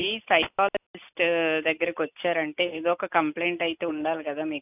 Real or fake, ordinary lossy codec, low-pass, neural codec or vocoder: real; none; 3.6 kHz; none